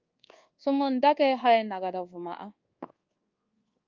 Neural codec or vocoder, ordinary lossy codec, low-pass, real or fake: codec, 24 kHz, 1.2 kbps, DualCodec; Opus, 32 kbps; 7.2 kHz; fake